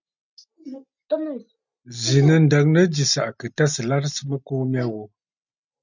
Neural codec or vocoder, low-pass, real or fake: none; 7.2 kHz; real